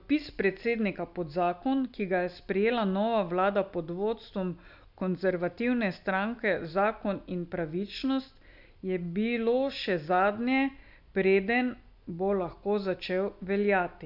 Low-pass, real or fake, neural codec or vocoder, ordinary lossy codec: 5.4 kHz; real; none; none